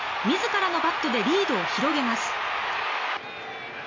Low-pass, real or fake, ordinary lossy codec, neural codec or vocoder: 7.2 kHz; real; MP3, 48 kbps; none